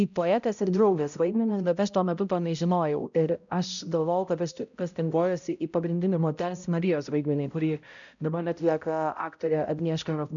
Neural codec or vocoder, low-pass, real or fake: codec, 16 kHz, 0.5 kbps, X-Codec, HuBERT features, trained on balanced general audio; 7.2 kHz; fake